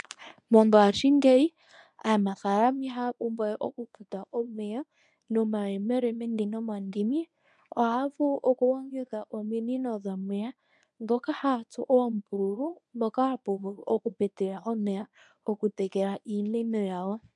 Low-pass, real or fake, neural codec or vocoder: 10.8 kHz; fake; codec, 24 kHz, 0.9 kbps, WavTokenizer, medium speech release version 1